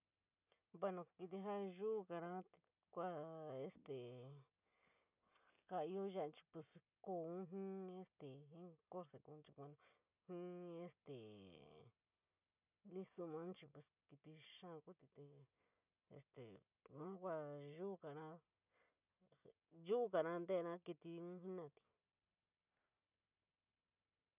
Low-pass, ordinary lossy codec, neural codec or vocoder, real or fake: 3.6 kHz; none; none; real